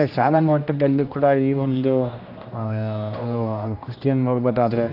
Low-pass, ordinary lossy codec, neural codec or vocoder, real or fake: 5.4 kHz; none; codec, 16 kHz, 1 kbps, X-Codec, HuBERT features, trained on general audio; fake